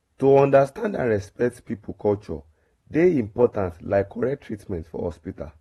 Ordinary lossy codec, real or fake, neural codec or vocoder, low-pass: AAC, 32 kbps; fake; vocoder, 44.1 kHz, 128 mel bands every 512 samples, BigVGAN v2; 19.8 kHz